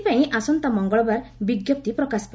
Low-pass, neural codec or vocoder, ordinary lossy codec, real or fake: none; none; none; real